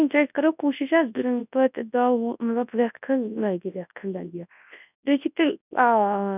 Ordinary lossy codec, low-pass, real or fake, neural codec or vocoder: none; 3.6 kHz; fake; codec, 24 kHz, 0.9 kbps, WavTokenizer, large speech release